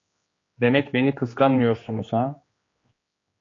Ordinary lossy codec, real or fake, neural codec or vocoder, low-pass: AAC, 32 kbps; fake; codec, 16 kHz, 2 kbps, X-Codec, HuBERT features, trained on general audio; 7.2 kHz